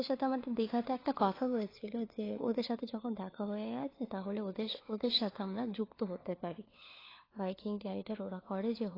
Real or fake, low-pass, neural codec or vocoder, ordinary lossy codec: fake; 5.4 kHz; codec, 16 kHz, 4 kbps, FunCodec, trained on LibriTTS, 50 frames a second; AAC, 24 kbps